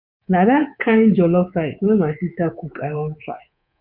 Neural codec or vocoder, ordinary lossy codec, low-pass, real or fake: codec, 24 kHz, 3.1 kbps, DualCodec; none; 5.4 kHz; fake